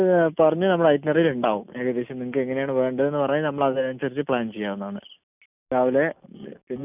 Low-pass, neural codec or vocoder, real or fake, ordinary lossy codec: 3.6 kHz; none; real; none